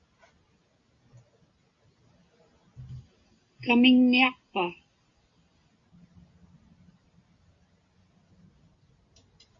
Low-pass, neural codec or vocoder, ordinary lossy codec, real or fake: 7.2 kHz; none; Opus, 64 kbps; real